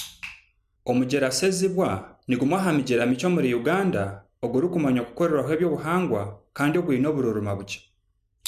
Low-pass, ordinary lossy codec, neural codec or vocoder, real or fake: 14.4 kHz; none; none; real